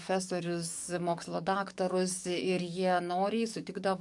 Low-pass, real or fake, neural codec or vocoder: 10.8 kHz; fake; codec, 44.1 kHz, 7.8 kbps, DAC